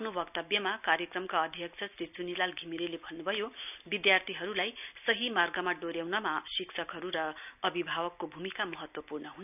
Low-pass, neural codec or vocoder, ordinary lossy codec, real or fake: 3.6 kHz; none; none; real